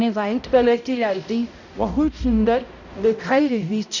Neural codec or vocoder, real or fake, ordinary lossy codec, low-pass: codec, 16 kHz, 0.5 kbps, X-Codec, HuBERT features, trained on balanced general audio; fake; none; 7.2 kHz